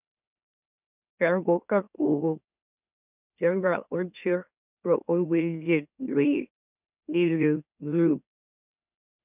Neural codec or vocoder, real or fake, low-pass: autoencoder, 44.1 kHz, a latent of 192 numbers a frame, MeloTTS; fake; 3.6 kHz